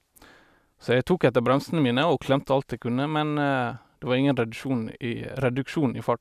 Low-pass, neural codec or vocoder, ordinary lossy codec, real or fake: 14.4 kHz; none; none; real